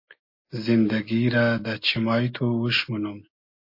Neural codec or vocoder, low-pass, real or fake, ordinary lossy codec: none; 5.4 kHz; real; MP3, 32 kbps